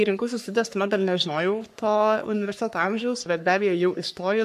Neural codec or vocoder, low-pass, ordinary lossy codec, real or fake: codec, 44.1 kHz, 3.4 kbps, Pupu-Codec; 14.4 kHz; AAC, 96 kbps; fake